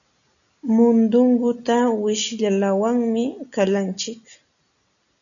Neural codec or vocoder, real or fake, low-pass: none; real; 7.2 kHz